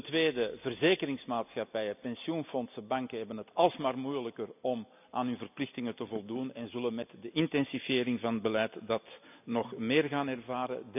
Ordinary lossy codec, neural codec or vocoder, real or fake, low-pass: none; none; real; 3.6 kHz